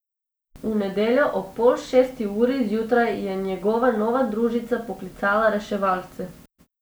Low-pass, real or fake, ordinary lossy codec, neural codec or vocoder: none; real; none; none